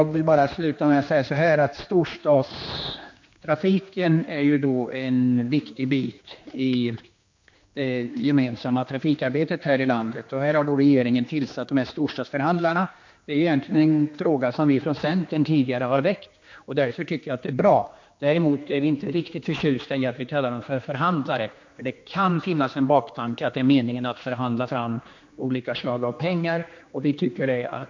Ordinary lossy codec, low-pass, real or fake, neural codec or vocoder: MP3, 48 kbps; 7.2 kHz; fake; codec, 16 kHz, 2 kbps, X-Codec, HuBERT features, trained on general audio